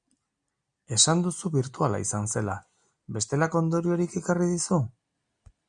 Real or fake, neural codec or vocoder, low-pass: real; none; 9.9 kHz